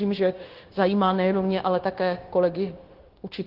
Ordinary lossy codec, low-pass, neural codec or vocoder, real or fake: Opus, 16 kbps; 5.4 kHz; codec, 16 kHz, 0.9 kbps, LongCat-Audio-Codec; fake